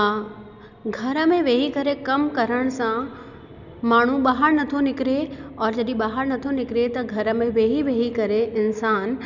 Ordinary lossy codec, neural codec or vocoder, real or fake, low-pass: none; none; real; 7.2 kHz